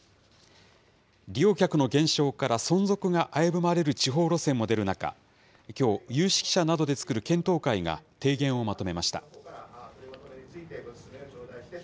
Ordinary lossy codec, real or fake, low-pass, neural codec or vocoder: none; real; none; none